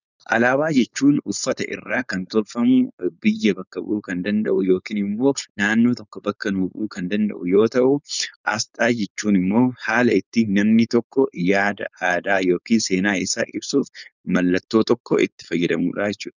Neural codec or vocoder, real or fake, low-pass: codec, 16 kHz, 4.8 kbps, FACodec; fake; 7.2 kHz